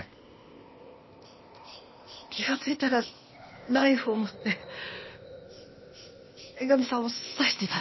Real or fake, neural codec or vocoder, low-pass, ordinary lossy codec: fake; codec, 16 kHz, 0.8 kbps, ZipCodec; 7.2 kHz; MP3, 24 kbps